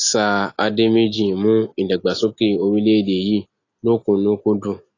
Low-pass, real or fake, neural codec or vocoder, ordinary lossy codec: 7.2 kHz; real; none; AAC, 32 kbps